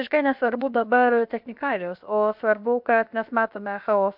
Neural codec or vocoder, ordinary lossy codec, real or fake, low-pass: codec, 16 kHz, about 1 kbps, DyCAST, with the encoder's durations; MP3, 48 kbps; fake; 5.4 kHz